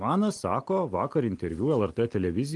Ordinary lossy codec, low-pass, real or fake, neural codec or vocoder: Opus, 24 kbps; 10.8 kHz; real; none